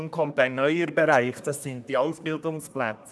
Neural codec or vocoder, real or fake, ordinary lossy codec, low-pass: codec, 24 kHz, 1 kbps, SNAC; fake; none; none